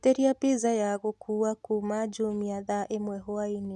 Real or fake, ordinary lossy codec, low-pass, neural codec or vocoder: real; none; none; none